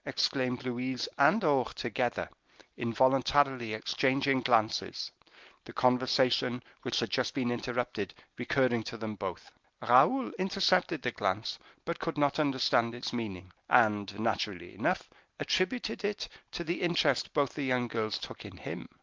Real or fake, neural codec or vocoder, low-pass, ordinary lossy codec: real; none; 7.2 kHz; Opus, 24 kbps